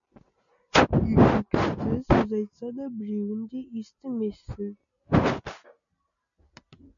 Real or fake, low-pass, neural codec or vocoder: real; 7.2 kHz; none